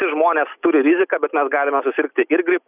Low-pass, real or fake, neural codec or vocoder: 3.6 kHz; real; none